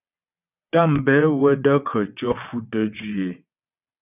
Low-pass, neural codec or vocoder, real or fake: 3.6 kHz; vocoder, 22.05 kHz, 80 mel bands, WaveNeXt; fake